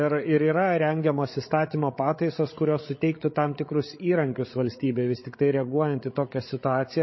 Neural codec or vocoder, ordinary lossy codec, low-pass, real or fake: codec, 16 kHz, 16 kbps, FreqCodec, larger model; MP3, 24 kbps; 7.2 kHz; fake